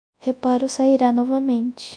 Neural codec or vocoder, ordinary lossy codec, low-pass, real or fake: codec, 24 kHz, 0.9 kbps, WavTokenizer, large speech release; MP3, 64 kbps; 9.9 kHz; fake